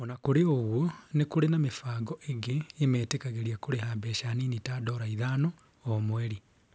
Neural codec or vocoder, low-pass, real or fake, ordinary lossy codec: none; none; real; none